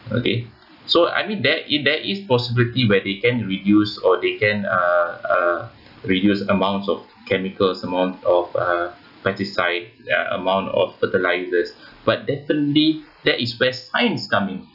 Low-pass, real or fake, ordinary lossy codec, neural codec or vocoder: 5.4 kHz; real; none; none